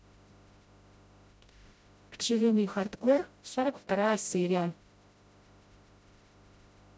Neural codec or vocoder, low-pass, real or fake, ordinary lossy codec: codec, 16 kHz, 0.5 kbps, FreqCodec, smaller model; none; fake; none